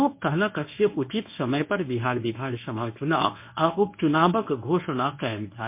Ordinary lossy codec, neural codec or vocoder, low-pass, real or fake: MP3, 24 kbps; codec, 24 kHz, 0.9 kbps, WavTokenizer, medium speech release version 1; 3.6 kHz; fake